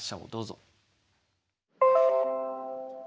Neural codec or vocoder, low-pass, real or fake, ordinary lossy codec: none; none; real; none